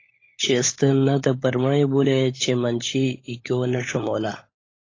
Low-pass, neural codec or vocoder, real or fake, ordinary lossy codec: 7.2 kHz; codec, 16 kHz, 16 kbps, FunCodec, trained on LibriTTS, 50 frames a second; fake; AAC, 32 kbps